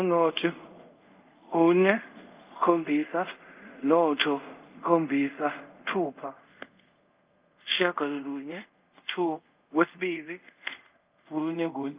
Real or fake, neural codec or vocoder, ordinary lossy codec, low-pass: fake; codec, 24 kHz, 0.5 kbps, DualCodec; Opus, 24 kbps; 3.6 kHz